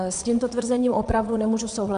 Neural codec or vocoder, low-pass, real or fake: vocoder, 22.05 kHz, 80 mel bands, Vocos; 9.9 kHz; fake